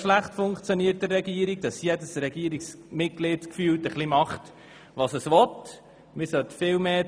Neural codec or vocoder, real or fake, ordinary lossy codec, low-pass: none; real; none; none